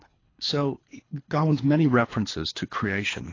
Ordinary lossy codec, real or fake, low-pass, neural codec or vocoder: AAC, 32 kbps; fake; 7.2 kHz; codec, 24 kHz, 3 kbps, HILCodec